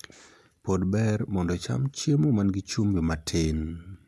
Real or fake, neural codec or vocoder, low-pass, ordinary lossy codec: real; none; none; none